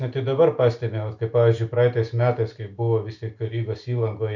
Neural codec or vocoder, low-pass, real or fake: none; 7.2 kHz; real